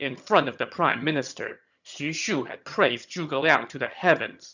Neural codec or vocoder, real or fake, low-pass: vocoder, 22.05 kHz, 80 mel bands, HiFi-GAN; fake; 7.2 kHz